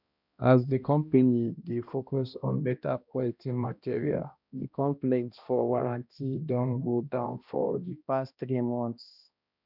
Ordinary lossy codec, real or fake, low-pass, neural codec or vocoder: none; fake; 5.4 kHz; codec, 16 kHz, 1 kbps, X-Codec, HuBERT features, trained on balanced general audio